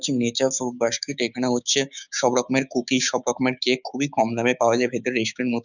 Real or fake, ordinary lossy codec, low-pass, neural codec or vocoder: fake; none; 7.2 kHz; codec, 16 kHz, 6 kbps, DAC